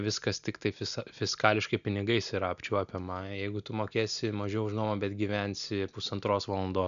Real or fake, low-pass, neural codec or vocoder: real; 7.2 kHz; none